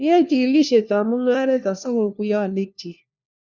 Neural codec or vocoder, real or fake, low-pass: codec, 16 kHz, 1 kbps, FunCodec, trained on LibriTTS, 50 frames a second; fake; 7.2 kHz